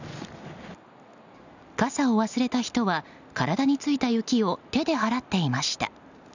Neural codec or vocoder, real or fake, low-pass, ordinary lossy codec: none; real; 7.2 kHz; none